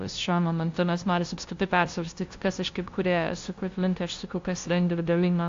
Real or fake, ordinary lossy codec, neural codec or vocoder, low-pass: fake; AAC, 48 kbps; codec, 16 kHz, 0.5 kbps, FunCodec, trained on LibriTTS, 25 frames a second; 7.2 kHz